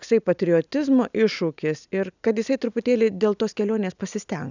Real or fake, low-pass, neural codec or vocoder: real; 7.2 kHz; none